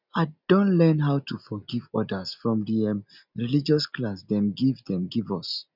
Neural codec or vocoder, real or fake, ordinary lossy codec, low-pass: none; real; none; 5.4 kHz